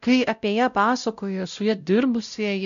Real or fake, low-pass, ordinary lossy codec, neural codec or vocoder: fake; 7.2 kHz; AAC, 96 kbps; codec, 16 kHz, 0.5 kbps, X-Codec, WavLM features, trained on Multilingual LibriSpeech